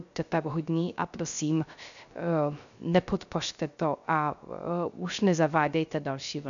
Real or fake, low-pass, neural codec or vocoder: fake; 7.2 kHz; codec, 16 kHz, 0.3 kbps, FocalCodec